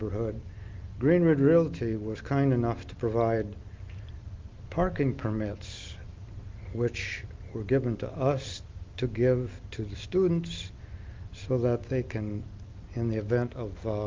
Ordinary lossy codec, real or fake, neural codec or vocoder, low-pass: Opus, 32 kbps; real; none; 7.2 kHz